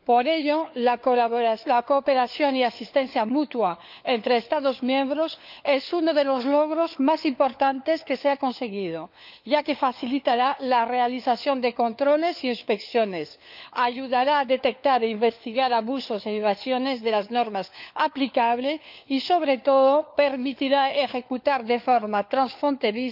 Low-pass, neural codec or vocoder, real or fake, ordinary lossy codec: 5.4 kHz; codec, 16 kHz, 4 kbps, FunCodec, trained on LibriTTS, 50 frames a second; fake; none